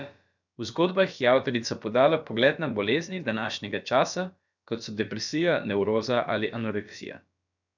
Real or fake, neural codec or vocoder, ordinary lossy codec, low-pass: fake; codec, 16 kHz, about 1 kbps, DyCAST, with the encoder's durations; none; 7.2 kHz